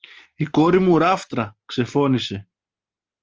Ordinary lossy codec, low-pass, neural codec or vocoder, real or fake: Opus, 24 kbps; 7.2 kHz; none; real